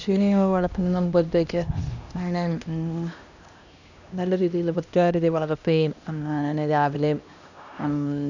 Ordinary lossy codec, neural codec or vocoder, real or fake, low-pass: none; codec, 16 kHz, 1 kbps, X-Codec, HuBERT features, trained on LibriSpeech; fake; 7.2 kHz